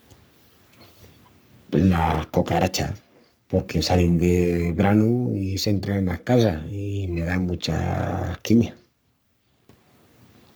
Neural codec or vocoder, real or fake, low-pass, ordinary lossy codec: codec, 44.1 kHz, 3.4 kbps, Pupu-Codec; fake; none; none